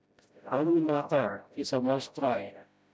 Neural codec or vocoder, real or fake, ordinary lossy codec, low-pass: codec, 16 kHz, 0.5 kbps, FreqCodec, smaller model; fake; none; none